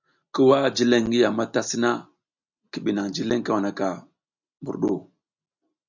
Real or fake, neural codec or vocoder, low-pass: real; none; 7.2 kHz